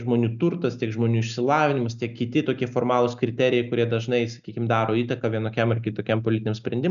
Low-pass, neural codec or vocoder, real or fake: 7.2 kHz; none; real